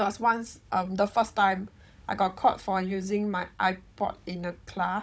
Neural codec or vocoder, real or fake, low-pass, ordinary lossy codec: codec, 16 kHz, 16 kbps, FunCodec, trained on Chinese and English, 50 frames a second; fake; none; none